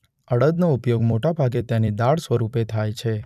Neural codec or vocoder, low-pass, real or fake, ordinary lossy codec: vocoder, 44.1 kHz, 128 mel bands every 256 samples, BigVGAN v2; 14.4 kHz; fake; none